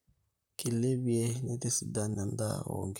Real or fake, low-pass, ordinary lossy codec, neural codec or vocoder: fake; none; none; vocoder, 44.1 kHz, 128 mel bands, Pupu-Vocoder